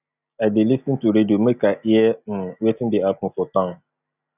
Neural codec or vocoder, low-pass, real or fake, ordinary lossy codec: none; 3.6 kHz; real; none